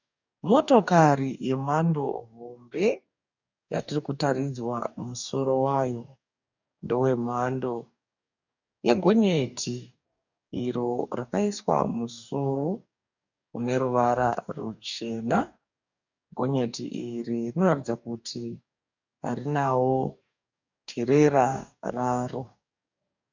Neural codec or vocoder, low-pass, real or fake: codec, 44.1 kHz, 2.6 kbps, DAC; 7.2 kHz; fake